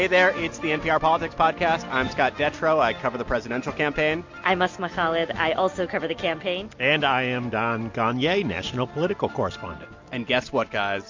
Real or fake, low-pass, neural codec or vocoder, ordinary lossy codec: real; 7.2 kHz; none; MP3, 48 kbps